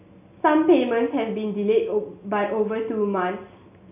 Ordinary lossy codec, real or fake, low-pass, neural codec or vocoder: none; real; 3.6 kHz; none